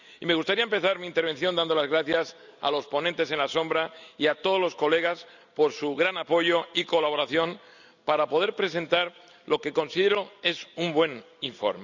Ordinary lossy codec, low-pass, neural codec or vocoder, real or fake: none; 7.2 kHz; none; real